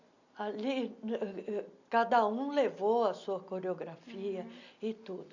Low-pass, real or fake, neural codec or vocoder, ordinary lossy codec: 7.2 kHz; real; none; none